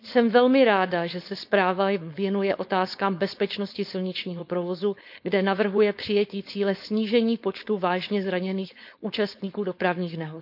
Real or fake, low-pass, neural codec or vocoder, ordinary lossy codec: fake; 5.4 kHz; codec, 16 kHz, 4.8 kbps, FACodec; none